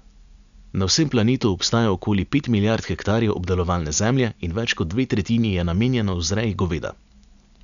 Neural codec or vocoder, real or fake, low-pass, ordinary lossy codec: none; real; 7.2 kHz; none